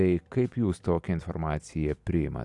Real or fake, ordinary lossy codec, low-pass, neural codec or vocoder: real; AAC, 64 kbps; 10.8 kHz; none